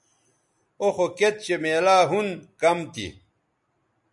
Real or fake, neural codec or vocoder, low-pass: real; none; 10.8 kHz